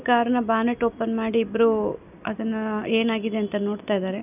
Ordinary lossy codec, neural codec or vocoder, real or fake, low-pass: none; none; real; 3.6 kHz